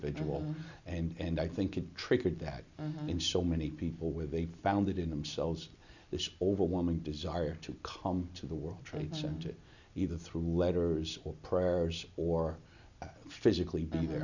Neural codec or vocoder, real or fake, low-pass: none; real; 7.2 kHz